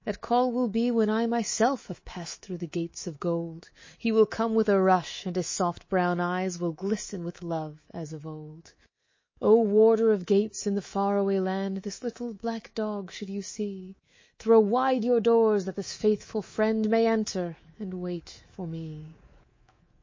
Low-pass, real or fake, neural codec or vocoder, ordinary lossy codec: 7.2 kHz; fake; codec, 24 kHz, 3.1 kbps, DualCodec; MP3, 32 kbps